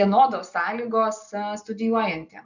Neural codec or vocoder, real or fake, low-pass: none; real; 7.2 kHz